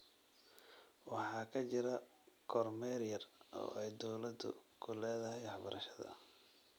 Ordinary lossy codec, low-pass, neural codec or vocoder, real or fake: none; none; none; real